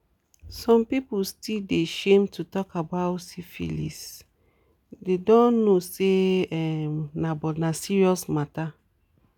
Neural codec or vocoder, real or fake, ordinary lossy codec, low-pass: none; real; none; 19.8 kHz